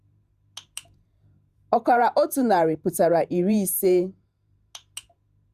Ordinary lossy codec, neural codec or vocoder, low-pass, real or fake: Opus, 64 kbps; vocoder, 48 kHz, 128 mel bands, Vocos; 14.4 kHz; fake